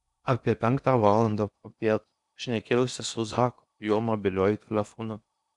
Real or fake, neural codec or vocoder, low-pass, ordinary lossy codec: fake; codec, 16 kHz in and 24 kHz out, 0.8 kbps, FocalCodec, streaming, 65536 codes; 10.8 kHz; Opus, 64 kbps